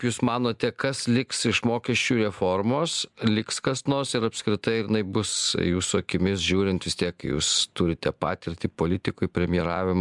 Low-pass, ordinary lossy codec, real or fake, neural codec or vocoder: 10.8 kHz; MP3, 96 kbps; real; none